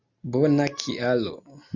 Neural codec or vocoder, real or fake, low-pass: none; real; 7.2 kHz